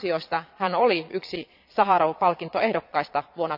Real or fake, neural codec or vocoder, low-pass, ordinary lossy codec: fake; vocoder, 22.05 kHz, 80 mel bands, Vocos; 5.4 kHz; AAC, 48 kbps